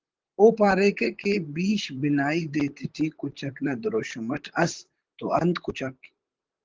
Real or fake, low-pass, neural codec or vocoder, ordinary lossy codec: fake; 7.2 kHz; vocoder, 44.1 kHz, 128 mel bands, Pupu-Vocoder; Opus, 16 kbps